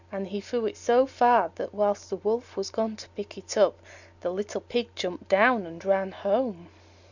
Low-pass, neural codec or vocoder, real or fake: 7.2 kHz; none; real